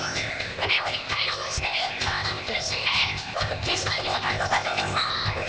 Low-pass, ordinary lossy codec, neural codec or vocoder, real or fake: none; none; codec, 16 kHz, 0.8 kbps, ZipCodec; fake